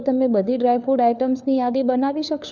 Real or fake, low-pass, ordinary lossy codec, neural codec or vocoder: fake; 7.2 kHz; none; codec, 16 kHz, 4 kbps, FunCodec, trained on LibriTTS, 50 frames a second